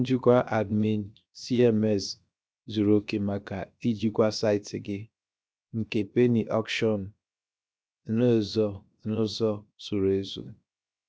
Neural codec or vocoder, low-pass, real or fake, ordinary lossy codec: codec, 16 kHz, 0.7 kbps, FocalCodec; none; fake; none